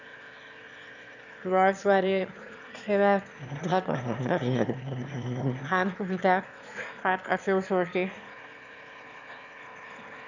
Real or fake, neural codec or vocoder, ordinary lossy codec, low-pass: fake; autoencoder, 22.05 kHz, a latent of 192 numbers a frame, VITS, trained on one speaker; none; 7.2 kHz